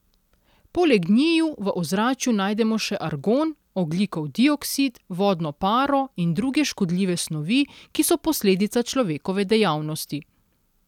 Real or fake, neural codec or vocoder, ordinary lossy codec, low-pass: real; none; none; 19.8 kHz